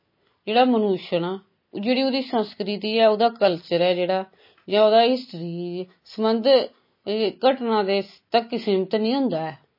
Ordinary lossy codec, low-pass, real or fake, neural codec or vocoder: MP3, 24 kbps; 5.4 kHz; real; none